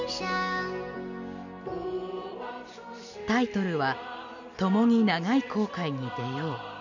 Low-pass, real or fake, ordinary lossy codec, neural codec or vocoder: 7.2 kHz; real; none; none